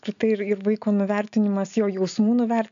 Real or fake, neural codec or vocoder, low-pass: real; none; 7.2 kHz